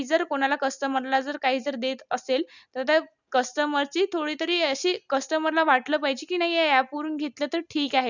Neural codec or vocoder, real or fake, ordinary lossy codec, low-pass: none; real; none; 7.2 kHz